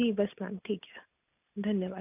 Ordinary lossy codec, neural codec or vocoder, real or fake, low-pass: none; none; real; 3.6 kHz